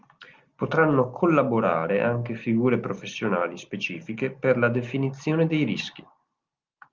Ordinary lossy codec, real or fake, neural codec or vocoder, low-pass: Opus, 32 kbps; real; none; 7.2 kHz